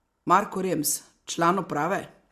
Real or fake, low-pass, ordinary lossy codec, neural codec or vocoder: real; 14.4 kHz; Opus, 64 kbps; none